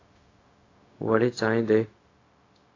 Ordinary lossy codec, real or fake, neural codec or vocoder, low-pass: AAC, 32 kbps; fake; codec, 16 kHz, 0.4 kbps, LongCat-Audio-Codec; 7.2 kHz